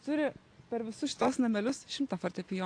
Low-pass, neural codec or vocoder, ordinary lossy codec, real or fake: 9.9 kHz; none; AAC, 48 kbps; real